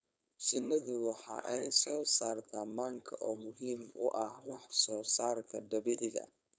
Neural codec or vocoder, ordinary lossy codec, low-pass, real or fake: codec, 16 kHz, 4.8 kbps, FACodec; none; none; fake